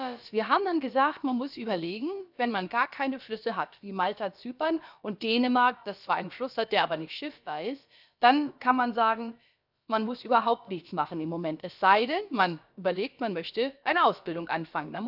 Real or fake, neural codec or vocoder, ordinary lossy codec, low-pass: fake; codec, 16 kHz, about 1 kbps, DyCAST, with the encoder's durations; none; 5.4 kHz